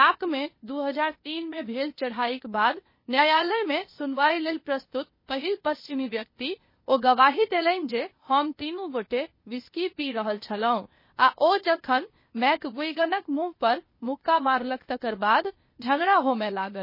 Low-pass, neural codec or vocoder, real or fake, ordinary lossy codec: 5.4 kHz; codec, 16 kHz, 0.8 kbps, ZipCodec; fake; MP3, 24 kbps